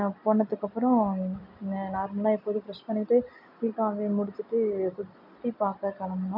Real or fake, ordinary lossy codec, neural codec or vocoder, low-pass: real; none; none; 5.4 kHz